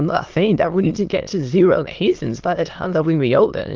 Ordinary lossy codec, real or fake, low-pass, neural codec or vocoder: Opus, 32 kbps; fake; 7.2 kHz; autoencoder, 22.05 kHz, a latent of 192 numbers a frame, VITS, trained on many speakers